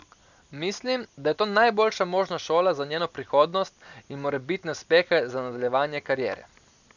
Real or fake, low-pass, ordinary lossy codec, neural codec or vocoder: real; 7.2 kHz; none; none